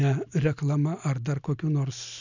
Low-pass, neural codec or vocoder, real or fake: 7.2 kHz; none; real